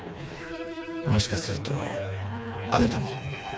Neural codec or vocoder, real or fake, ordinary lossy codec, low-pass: codec, 16 kHz, 2 kbps, FreqCodec, smaller model; fake; none; none